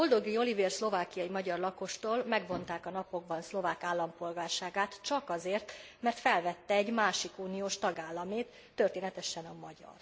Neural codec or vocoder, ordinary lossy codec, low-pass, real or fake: none; none; none; real